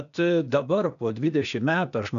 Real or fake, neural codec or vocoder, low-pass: fake; codec, 16 kHz, 0.8 kbps, ZipCodec; 7.2 kHz